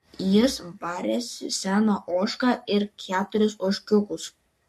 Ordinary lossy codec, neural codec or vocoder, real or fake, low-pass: MP3, 64 kbps; codec, 44.1 kHz, 7.8 kbps, Pupu-Codec; fake; 14.4 kHz